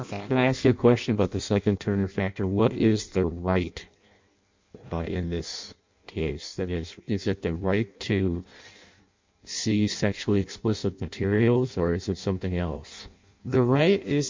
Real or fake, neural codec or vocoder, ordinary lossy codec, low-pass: fake; codec, 16 kHz in and 24 kHz out, 0.6 kbps, FireRedTTS-2 codec; MP3, 64 kbps; 7.2 kHz